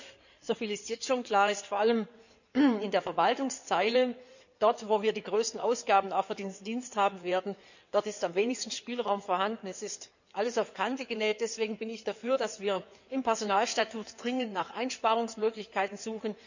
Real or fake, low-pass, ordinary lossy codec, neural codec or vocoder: fake; 7.2 kHz; none; codec, 16 kHz in and 24 kHz out, 2.2 kbps, FireRedTTS-2 codec